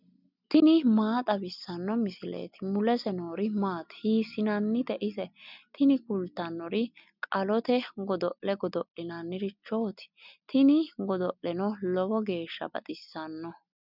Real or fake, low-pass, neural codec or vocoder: real; 5.4 kHz; none